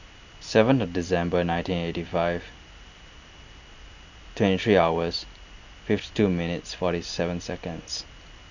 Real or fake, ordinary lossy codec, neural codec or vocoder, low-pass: real; none; none; 7.2 kHz